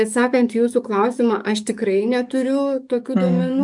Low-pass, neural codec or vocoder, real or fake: 10.8 kHz; codec, 44.1 kHz, 7.8 kbps, DAC; fake